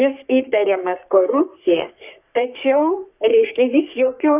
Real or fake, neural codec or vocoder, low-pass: fake; codec, 44.1 kHz, 3.4 kbps, Pupu-Codec; 3.6 kHz